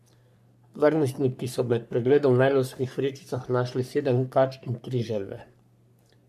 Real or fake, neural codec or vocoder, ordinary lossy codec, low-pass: fake; codec, 44.1 kHz, 3.4 kbps, Pupu-Codec; none; 14.4 kHz